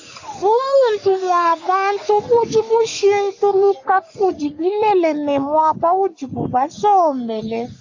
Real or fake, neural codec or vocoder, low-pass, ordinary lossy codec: fake; codec, 44.1 kHz, 3.4 kbps, Pupu-Codec; 7.2 kHz; AAC, 48 kbps